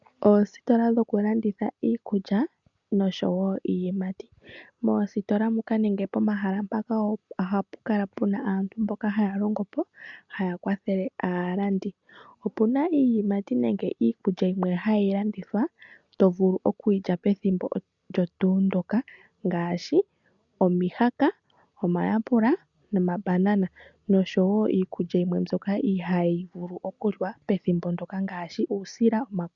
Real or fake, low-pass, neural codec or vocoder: real; 7.2 kHz; none